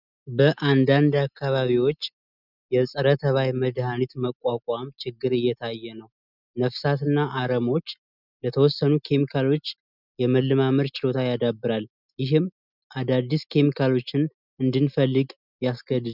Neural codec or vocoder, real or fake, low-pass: none; real; 5.4 kHz